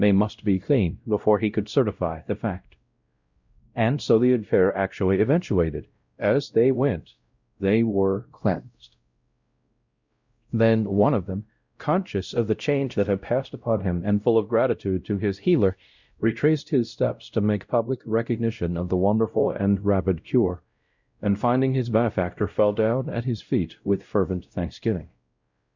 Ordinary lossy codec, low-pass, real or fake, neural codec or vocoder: Opus, 64 kbps; 7.2 kHz; fake; codec, 16 kHz, 0.5 kbps, X-Codec, WavLM features, trained on Multilingual LibriSpeech